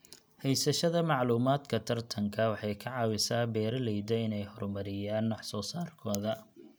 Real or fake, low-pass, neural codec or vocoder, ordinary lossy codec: real; none; none; none